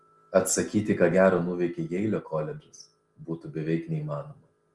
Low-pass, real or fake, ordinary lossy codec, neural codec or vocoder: 10.8 kHz; real; Opus, 24 kbps; none